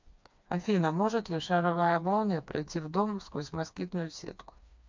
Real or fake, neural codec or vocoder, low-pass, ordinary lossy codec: fake; codec, 16 kHz, 2 kbps, FreqCodec, smaller model; 7.2 kHz; MP3, 64 kbps